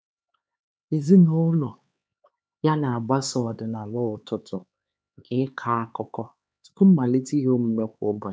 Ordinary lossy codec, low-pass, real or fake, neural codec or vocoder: none; none; fake; codec, 16 kHz, 4 kbps, X-Codec, HuBERT features, trained on LibriSpeech